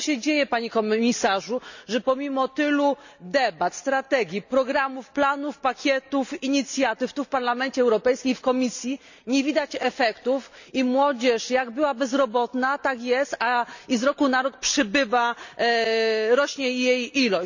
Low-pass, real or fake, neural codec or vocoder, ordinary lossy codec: 7.2 kHz; real; none; none